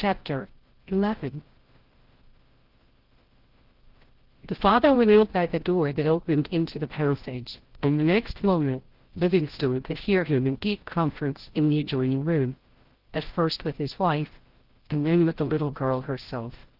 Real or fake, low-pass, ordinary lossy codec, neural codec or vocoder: fake; 5.4 kHz; Opus, 16 kbps; codec, 16 kHz, 0.5 kbps, FreqCodec, larger model